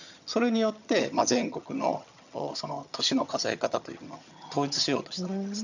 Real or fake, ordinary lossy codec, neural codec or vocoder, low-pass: fake; none; vocoder, 22.05 kHz, 80 mel bands, HiFi-GAN; 7.2 kHz